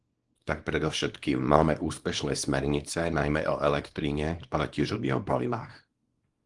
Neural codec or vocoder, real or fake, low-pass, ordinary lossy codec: codec, 24 kHz, 0.9 kbps, WavTokenizer, small release; fake; 10.8 kHz; Opus, 24 kbps